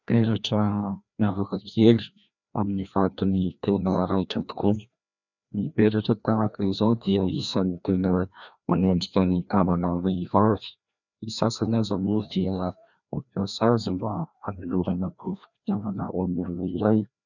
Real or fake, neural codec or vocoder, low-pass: fake; codec, 16 kHz, 1 kbps, FreqCodec, larger model; 7.2 kHz